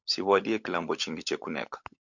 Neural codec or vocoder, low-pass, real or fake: codec, 16 kHz, 16 kbps, FunCodec, trained on LibriTTS, 50 frames a second; 7.2 kHz; fake